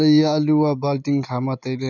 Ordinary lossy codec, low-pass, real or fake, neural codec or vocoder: none; 7.2 kHz; real; none